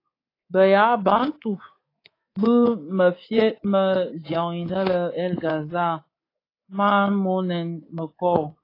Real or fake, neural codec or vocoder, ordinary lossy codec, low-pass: fake; codec, 24 kHz, 3.1 kbps, DualCodec; AAC, 24 kbps; 5.4 kHz